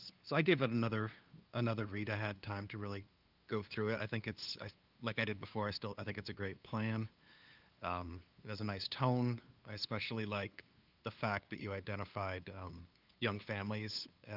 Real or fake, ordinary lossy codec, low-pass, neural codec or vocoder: fake; Opus, 24 kbps; 5.4 kHz; codec, 16 kHz, 2 kbps, FunCodec, trained on LibriTTS, 25 frames a second